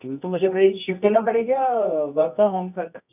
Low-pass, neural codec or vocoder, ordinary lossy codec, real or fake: 3.6 kHz; codec, 24 kHz, 0.9 kbps, WavTokenizer, medium music audio release; none; fake